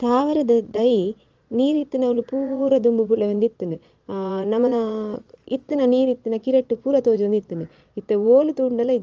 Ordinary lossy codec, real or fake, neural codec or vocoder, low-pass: Opus, 32 kbps; fake; vocoder, 22.05 kHz, 80 mel bands, Vocos; 7.2 kHz